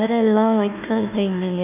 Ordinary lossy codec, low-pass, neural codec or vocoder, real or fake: none; 3.6 kHz; codec, 16 kHz, 1 kbps, FunCodec, trained on Chinese and English, 50 frames a second; fake